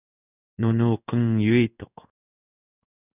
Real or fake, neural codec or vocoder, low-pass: fake; codec, 16 kHz in and 24 kHz out, 1 kbps, XY-Tokenizer; 3.6 kHz